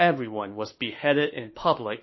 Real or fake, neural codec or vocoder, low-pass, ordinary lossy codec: fake; autoencoder, 48 kHz, 32 numbers a frame, DAC-VAE, trained on Japanese speech; 7.2 kHz; MP3, 24 kbps